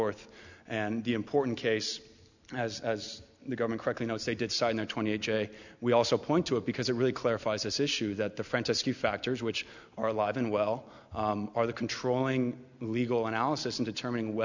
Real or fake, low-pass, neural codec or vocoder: real; 7.2 kHz; none